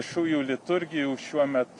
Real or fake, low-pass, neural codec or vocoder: real; 10.8 kHz; none